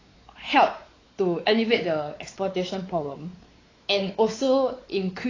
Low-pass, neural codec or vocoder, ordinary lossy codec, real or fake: 7.2 kHz; codec, 16 kHz, 4 kbps, X-Codec, WavLM features, trained on Multilingual LibriSpeech; AAC, 32 kbps; fake